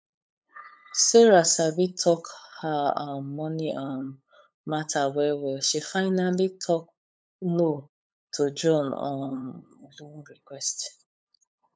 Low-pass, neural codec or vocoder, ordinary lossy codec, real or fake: none; codec, 16 kHz, 8 kbps, FunCodec, trained on LibriTTS, 25 frames a second; none; fake